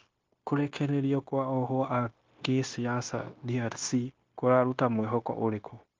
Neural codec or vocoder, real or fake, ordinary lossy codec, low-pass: codec, 16 kHz, 0.9 kbps, LongCat-Audio-Codec; fake; Opus, 32 kbps; 7.2 kHz